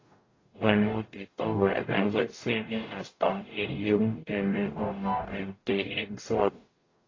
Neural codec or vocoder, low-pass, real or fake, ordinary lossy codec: codec, 44.1 kHz, 0.9 kbps, DAC; 7.2 kHz; fake; AAC, 32 kbps